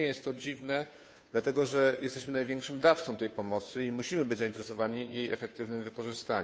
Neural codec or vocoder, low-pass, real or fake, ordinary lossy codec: codec, 16 kHz, 2 kbps, FunCodec, trained on Chinese and English, 25 frames a second; none; fake; none